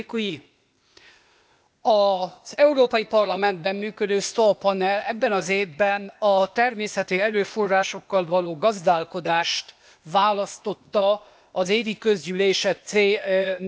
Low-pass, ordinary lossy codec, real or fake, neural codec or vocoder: none; none; fake; codec, 16 kHz, 0.8 kbps, ZipCodec